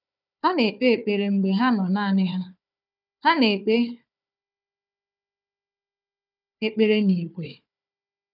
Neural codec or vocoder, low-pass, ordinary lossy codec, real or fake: codec, 16 kHz, 4 kbps, FunCodec, trained on Chinese and English, 50 frames a second; 5.4 kHz; none; fake